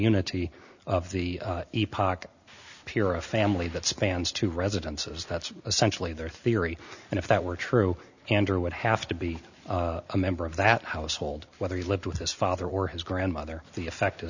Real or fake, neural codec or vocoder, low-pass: real; none; 7.2 kHz